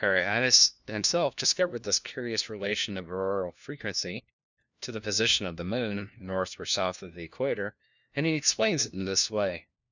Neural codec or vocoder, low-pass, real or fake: codec, 16 kHz, 1 kbps, FunCodec, trained on LibriTTS, 50 frames a second; 7.2 kHz; fake